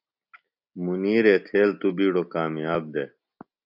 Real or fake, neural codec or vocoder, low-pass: real; none; 5.4 kHz